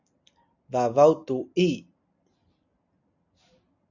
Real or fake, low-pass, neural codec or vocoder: real; 7.2 kHz; none